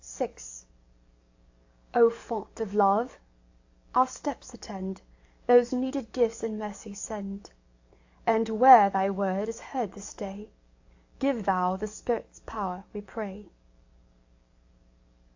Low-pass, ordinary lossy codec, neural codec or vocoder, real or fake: 7.2 kHz; AAC, 48 kbps; codec, 44.1 kHz, 7.8 kbps, DAC; fake